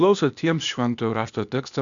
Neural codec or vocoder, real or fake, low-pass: codec, 16 kHz, 0.8 kbps, ZipCodec; fake; 7.2 kHz